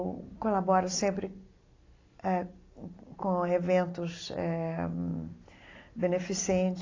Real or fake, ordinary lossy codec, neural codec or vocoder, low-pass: real; AAC, 32 kbps; none; 7.2 kHz